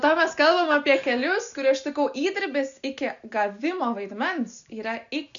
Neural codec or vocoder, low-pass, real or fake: none; 7.2 kHz; real